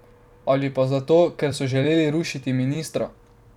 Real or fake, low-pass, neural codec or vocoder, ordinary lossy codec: fake; 19.8 kHz; vocoder, 44.1 kHz, 128 mel bands every 256 samples, BigVGAN v2; none